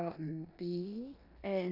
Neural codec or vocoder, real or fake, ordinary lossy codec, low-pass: codec, 16 kHz in and 24 kHz out, 0.9 kbps, LongCat-Audio-Codec, four codebook decoder; fake; none; 5.4 kHz